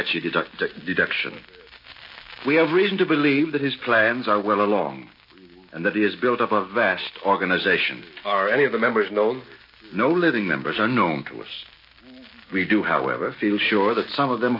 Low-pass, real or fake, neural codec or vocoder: 5.4 kHz; real; none